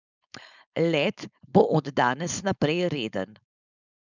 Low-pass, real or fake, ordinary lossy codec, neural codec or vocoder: 7.2 kHz; fake; none; codec, 16 kHz, 4.8 kbps, FACodec